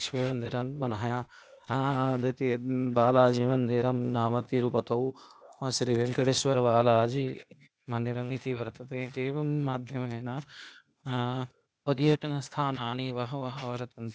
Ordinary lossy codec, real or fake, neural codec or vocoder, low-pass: none; fake; codec, 16 kHz, 0.8 kbps, ZipCodec; none